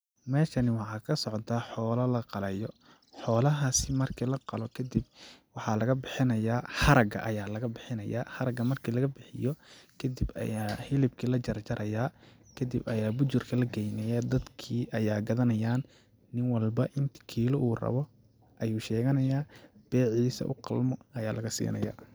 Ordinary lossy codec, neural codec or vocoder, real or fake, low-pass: none; none; real; none